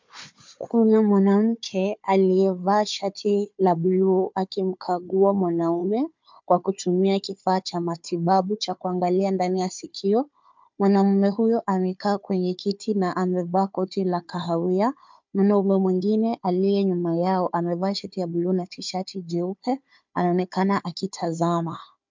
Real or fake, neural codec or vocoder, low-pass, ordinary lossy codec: fake; codec, 16 kHz, 4 kbps, FunCodec, trained on Chinese and English, 50 frames a second; 7.2 kHz; MP3, 64 kbps